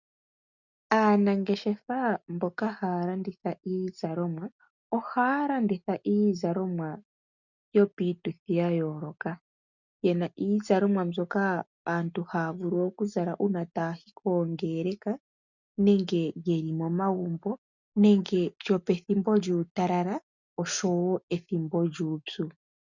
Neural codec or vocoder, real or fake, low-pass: none; real; 7.2 kHz